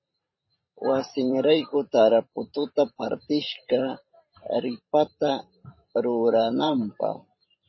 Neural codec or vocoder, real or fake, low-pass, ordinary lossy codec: vocoder, 44.1 kHz, 128 mel bands every 512 samples, BigVGAN v2; fake; 7.2 kHz; MP3, 24 kbps